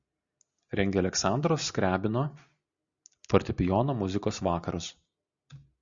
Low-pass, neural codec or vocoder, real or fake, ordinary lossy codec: 7.2 kHz; none; real; Opus, 64 kbps